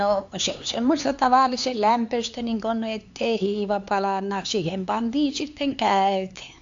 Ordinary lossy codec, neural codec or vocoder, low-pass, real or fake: AAC, 64 kbps; codec, 16 kHz, 4 kbps, X-Codec, HuBERT features, trained on LibriSpeech; 7.2 kHz; fake